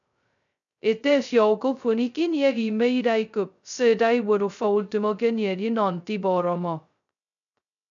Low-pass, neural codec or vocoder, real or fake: 7.2 kHz; codec, 16 kHz, 0.2 kbps, FocalCodec; fake